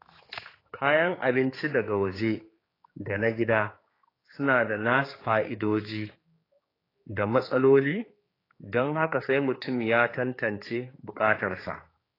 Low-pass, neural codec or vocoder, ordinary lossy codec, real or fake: 5.4 kHz; codec, 16 kHz, 4 kbps, X-Codec, HuBERT features, trained on general audio; AAC, 24 kbps; fake